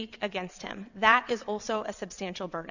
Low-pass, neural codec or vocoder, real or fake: 7.2 kHz; vocoder, 22.05 kHz, 80 mel bands, WaveNeXt; fake